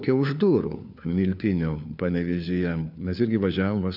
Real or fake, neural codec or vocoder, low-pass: fake; codec, 16 kHz, 4 kbps, FunCodec, trained on LibriTTS, 50 frames a second; 5.4 kHz